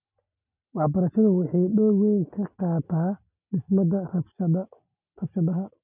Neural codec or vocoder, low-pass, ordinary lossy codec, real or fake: none; 3.6 kHz; none; real